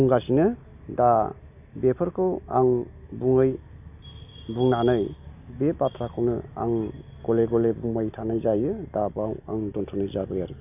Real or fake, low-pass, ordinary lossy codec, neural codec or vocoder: real; 3.6 kHz; none; none